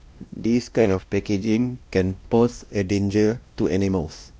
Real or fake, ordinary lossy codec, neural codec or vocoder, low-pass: fake; none; codec, 16 kHz, 1 kbps, X-Codec, WavLM features, trained on Multilingual LibriSpeech; none